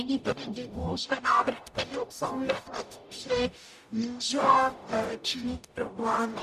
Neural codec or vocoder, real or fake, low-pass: codec, 44.1 kHz, 0.9 kbps, DAC; fake; 14.4 kHz